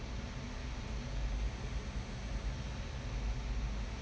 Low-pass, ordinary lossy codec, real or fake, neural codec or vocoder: none; none; real; none